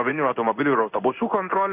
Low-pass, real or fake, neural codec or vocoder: 3.6 kHz; fake; codec, 16 kHz in and 24 kHz out, 1 kbps, XY-Tokenizer